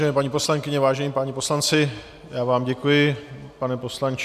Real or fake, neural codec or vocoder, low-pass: real; none; 14.4 kHz